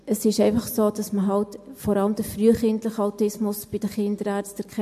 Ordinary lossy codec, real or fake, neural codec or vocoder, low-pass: MP3, 64 kbps; real; none; 14.4 kHz